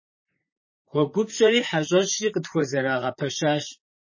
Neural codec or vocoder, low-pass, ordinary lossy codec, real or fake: vocoder, 44.1 kHz, 128 mel bands, Pupu-Vocoder; 7.2 kHz; MP3, 32 kbps; fake